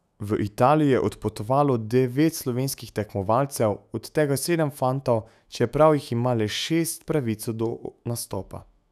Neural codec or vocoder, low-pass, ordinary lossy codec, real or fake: autoencoder, 48 kHz, 128 numbers a frame, DAC-VAE, trained on Japanese speech; 14.4 kHz; none; fake